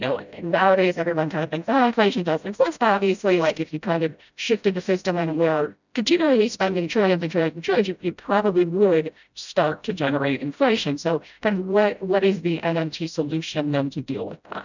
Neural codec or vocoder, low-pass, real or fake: codec, 16 kHz, 0.5 kbps, FreqCodec, smaller model; 7.2 kHz; fake